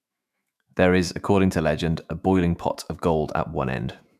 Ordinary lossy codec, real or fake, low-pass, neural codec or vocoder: none; fake; 14.4 kHz; autoencoder, 48 kHz, 128 numbers a frame, DAC-VAE, trained on Japanese speech